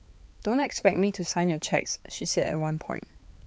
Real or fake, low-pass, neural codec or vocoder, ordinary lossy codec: fake; none; codec, 16 kHz, 4 kbps, X-Codec, HuBERT features, trained on balanced general audio; none